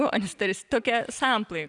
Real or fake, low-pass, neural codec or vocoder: real; 10.8 kHz; none